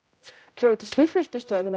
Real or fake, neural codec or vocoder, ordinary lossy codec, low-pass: fake; codec, 16 kHz, 0.5 kbps, X-Codec, HuBERT features, trained on general audio; none; none